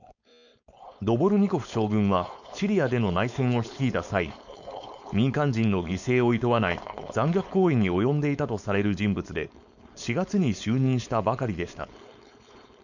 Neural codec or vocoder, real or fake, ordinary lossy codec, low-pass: codec, 16 kHz, 4.8 kbps, FACodec; fake; none; 7.2 kHz